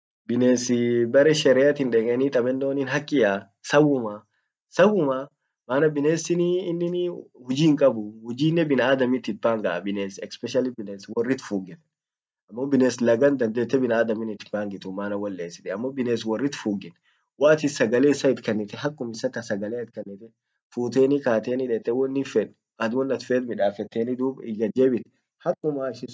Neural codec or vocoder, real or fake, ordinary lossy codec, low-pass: none; real; none; none